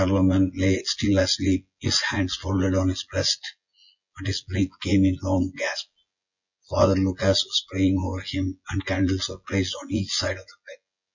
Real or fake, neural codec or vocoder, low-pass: fake; vocoder, 24 kHz, 100 mel bands, Vocos; 7.2 kHz